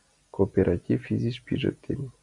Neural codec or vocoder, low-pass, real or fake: none; 10.8 kHz; real